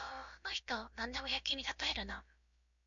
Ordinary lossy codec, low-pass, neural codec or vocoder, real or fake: MP3, 48 kbps; 7.2 kHz; codec, 16 kHz, about 1 kbps, DyCAST, with the encoder's durations; fake